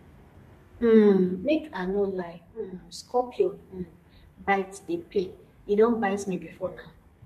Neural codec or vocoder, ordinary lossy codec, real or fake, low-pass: codec, 32 kHz, 1.9 kbps, SNAC; MP3, 64 kbps; fake; 14.4 kHz